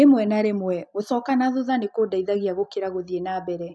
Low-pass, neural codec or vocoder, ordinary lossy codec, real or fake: none; none; none; real